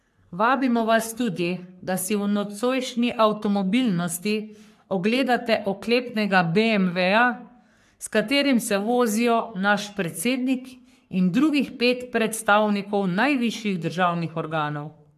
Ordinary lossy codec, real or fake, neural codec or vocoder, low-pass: AAC, 96 kbps; fake; codec, 44.1 kHz, 3.4 kbps, Pupu-Codec; 14.4 kHz